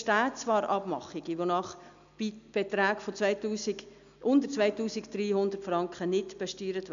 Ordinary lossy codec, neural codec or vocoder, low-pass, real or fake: AAC, 96 kbps; none; 7.2 kHz; real